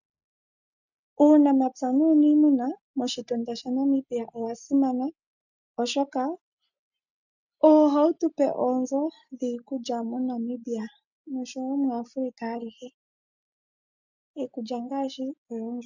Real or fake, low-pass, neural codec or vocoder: real; 7.2 kHz; none